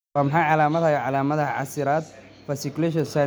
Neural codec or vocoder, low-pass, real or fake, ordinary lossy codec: none; none; real; none